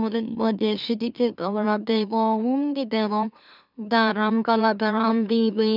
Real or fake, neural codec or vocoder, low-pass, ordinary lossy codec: fake; autoencoder, 44.1 kHz, a latent of 192 numbers a frame, MeloTTS; 5.4 kHz; none